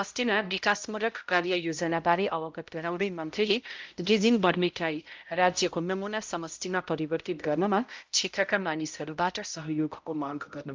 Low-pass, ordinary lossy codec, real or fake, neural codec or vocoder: 7.2 kHz; Opus, 24 kbps; fake; codec, 16 kHz, 0.5 kbps, X-Codec, HuBERT features, trained on balanced general audio